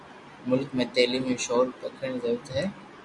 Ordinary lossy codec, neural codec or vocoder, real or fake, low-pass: MP3, 96 kbps; none; real; 10.8 kHz